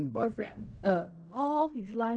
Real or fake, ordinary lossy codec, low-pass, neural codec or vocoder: fake; MP3, 64 kbps; 9.9 kHz; codec, 16 kHz in and 24 kHz out, 0.4 kbps, LongCat-Audio-Codec, fine tuned four codebook decoder